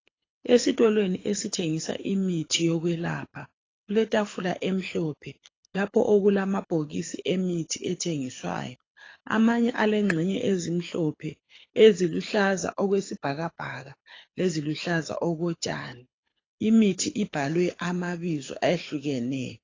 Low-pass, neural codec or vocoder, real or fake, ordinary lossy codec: 7.2 kHz; codec, 24 kHz, 6 kbps, HILCodec; fake; AAC, 32 kbps